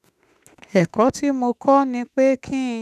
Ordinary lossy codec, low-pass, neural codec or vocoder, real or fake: none; 14.4 kHz; autoencoder, 48 kHz, 32 numbers a frame, DAC-VAE, trained on Japanese speech; fake